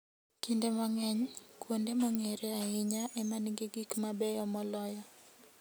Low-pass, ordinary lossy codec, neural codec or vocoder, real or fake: none; none; none; real